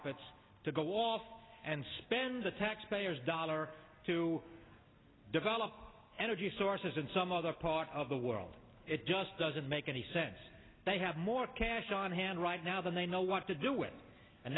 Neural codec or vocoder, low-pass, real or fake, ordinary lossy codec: none; 7.2 kHz; real; AAC, 16 kbps